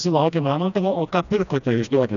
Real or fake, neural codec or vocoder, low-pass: fake; codec, 16 kHz, 1 kbps, FreqCodec, smaller model; 7.2 kHz